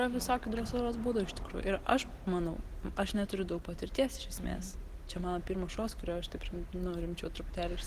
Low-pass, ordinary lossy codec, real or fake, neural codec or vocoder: 14.4 kHz; Opus, 16 kbps; real; none